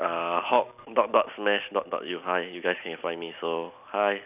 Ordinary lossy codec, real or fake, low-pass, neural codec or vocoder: none; real; 3.6 kHz; none